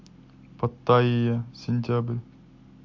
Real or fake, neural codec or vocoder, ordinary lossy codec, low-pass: real; none; MP3, 48 kbps; 7.2 kHz